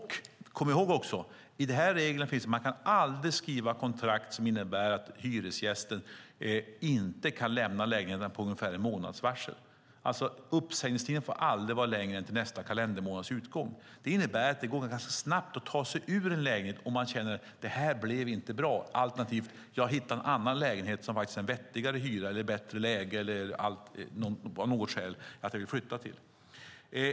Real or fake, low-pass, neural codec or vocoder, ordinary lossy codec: real; none; none; none